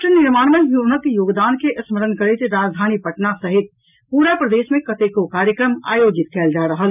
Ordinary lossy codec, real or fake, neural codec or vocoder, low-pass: none; real; none; 3.6 kHz